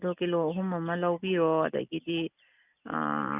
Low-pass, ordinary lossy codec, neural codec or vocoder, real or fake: 3.6 kHz; none; none; real